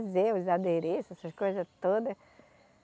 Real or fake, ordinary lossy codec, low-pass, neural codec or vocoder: real; none; none; none